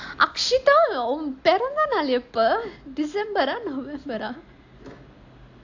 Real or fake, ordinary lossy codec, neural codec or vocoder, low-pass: real; AAC, 48 kbps; none; 7.2 kHz